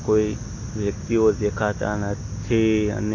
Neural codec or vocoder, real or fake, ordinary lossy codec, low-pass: none; real; AAC, 32 kbps; 7.2 kHz